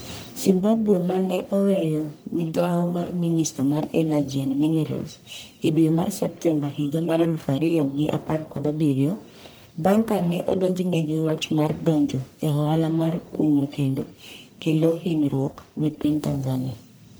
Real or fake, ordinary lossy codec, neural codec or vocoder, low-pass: fake; none; codec, 44.1 kHz, 1.7 kbps, Pupu-Codec; none